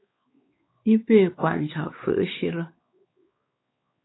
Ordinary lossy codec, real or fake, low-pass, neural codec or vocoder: AAC, 16 kbps; fake; 7.2 kHz; codec, 16 kHz, 4 kbps, X-Codec, WavLM features, trained on Multilingual LibriSpeech